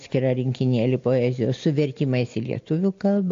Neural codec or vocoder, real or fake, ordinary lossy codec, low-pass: none; real; AAC, 48 kbps; 7.2 kHz